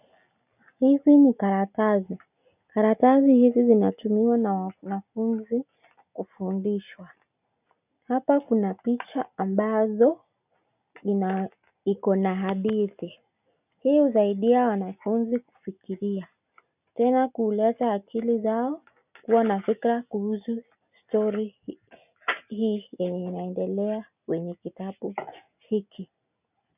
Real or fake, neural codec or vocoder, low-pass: real; none; 3.6 kHz